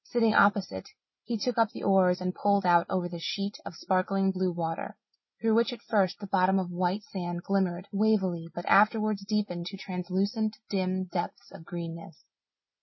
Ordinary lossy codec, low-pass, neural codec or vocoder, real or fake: MP3, 24 kbps; 7.2 kHz; none; real